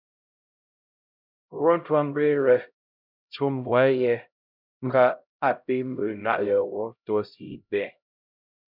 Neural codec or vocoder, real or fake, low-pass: codec, 16 kHz, 0.5 kbps, X-Codec, HuBERT features, trained on LibriSpeech; fake; 5.4 kHz